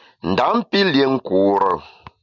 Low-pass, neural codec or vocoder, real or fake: 7.2 kHz; none; real